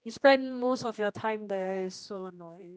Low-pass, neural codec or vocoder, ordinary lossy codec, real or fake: none; codec, 16 kHz, 1 kbps, X-Codec, HuBERT features, trained on general audio; none; fake